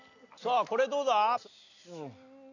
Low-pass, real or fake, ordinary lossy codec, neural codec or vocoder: 7.2 kHz; real; none; none